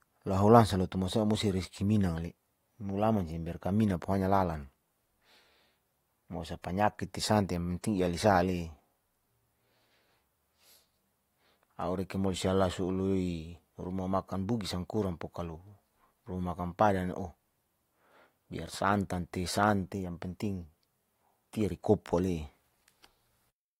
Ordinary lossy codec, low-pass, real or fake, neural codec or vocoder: AAC, 48 kbps; 19.8 kHz; real; none